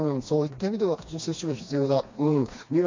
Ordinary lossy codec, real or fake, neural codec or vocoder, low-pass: AAC, 48 kbps; fake; codec, 16 kHz, 2 kbps, FreqCodec, smaller model; 7.2 kHz